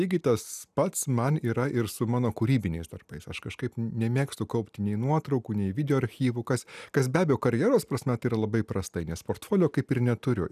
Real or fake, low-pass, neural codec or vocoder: real; 14.4 kHz; none